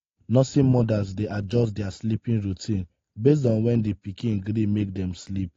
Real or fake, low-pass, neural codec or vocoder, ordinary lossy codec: real; 7.2 kHz; none; AAC, 32 kbps